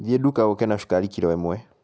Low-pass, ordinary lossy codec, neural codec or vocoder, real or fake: none; none; none; real